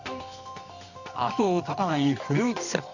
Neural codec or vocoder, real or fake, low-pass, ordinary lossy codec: codec, 24 kHz, 0.9 kbps, WavTokenizer, medium music audio release; fake; 7.2 kHz; none